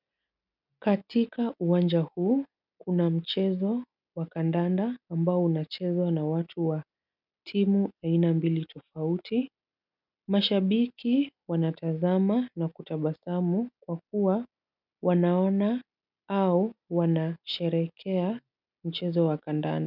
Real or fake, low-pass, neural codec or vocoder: real; 5.4 kHz; none